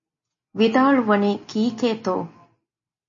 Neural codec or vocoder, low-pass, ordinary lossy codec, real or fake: none; 7.2 kHz; MP3, 32 kbps; real